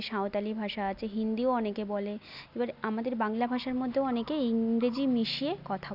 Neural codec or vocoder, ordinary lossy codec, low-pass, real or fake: none; none; 5.4 kHz; real